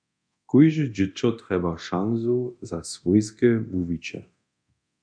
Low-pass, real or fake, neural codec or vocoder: 9.9 kHz; fake; codec, 24 kHz, 0.9 kbps, DualCodec